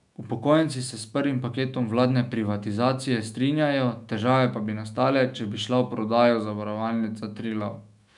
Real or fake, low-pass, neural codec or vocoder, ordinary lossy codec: fake; 10.8 kHz; autoencoder, 48 kHz, 128 numbers a frame, DAC-VAE, trained on Japanese speech; none